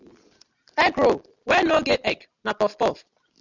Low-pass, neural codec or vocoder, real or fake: 7.2 kHz; none; real